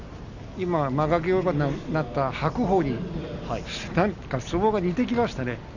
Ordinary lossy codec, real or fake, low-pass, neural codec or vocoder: none; real; 7.2 kHz; none